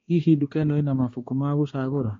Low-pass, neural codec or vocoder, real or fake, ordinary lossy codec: 7.2 kHz; codec, 16 kHz, 2 kbps, X-Codec, HuBERT features, trained on balanced general audio; fake; AAC, 32 kbps